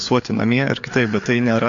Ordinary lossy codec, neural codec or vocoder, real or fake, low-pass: AAC, 48 kbps; codec, 16 kHz, 4 kbps, FreqCodec, larger model; fake; 7.2 kHz